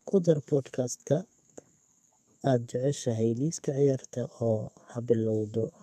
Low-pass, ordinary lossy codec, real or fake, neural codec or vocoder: 14.4 kHz; none; fake; codec, 32 kHz, 1.9 kbps, SNAC